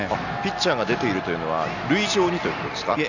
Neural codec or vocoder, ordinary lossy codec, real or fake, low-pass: none; none; real; 7.2 kHz